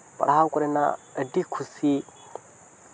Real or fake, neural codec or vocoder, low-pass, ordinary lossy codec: real; none; none; none